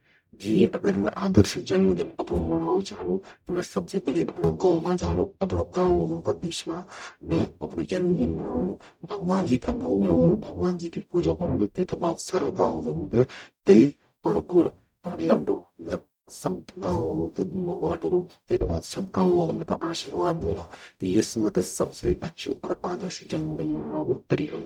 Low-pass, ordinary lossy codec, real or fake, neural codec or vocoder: 19.8 kHz; none; fake; codec, 44.1 kHz, 0.9 kbps, DAC